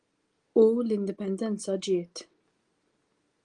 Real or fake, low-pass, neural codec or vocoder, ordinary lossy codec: real; 9.9 kHz; none; Opus, 24 kbps